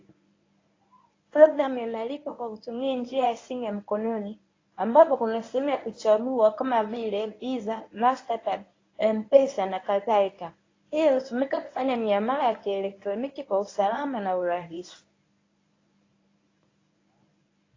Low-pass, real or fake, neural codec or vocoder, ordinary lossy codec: 7.2 kHz; fake; codec, 24 kHz, 0.9 kbps, WavTokenizer, medium speech release version 1; AAC, 32 kbps